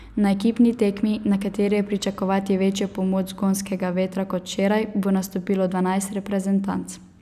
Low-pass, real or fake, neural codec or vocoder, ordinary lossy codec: 14.4 kHz; real; none; none